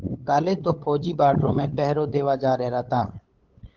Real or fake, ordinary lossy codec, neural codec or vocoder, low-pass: fake; Opus, 16 kbps; codec, 16 kHz, 8 kbps, FunCodec, trained on LibriTTS, 25 frames a second; 7.2 kHz